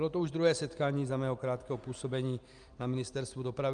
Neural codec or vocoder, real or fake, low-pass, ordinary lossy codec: none; real; 9.9 kHz; Opus, 32 kbps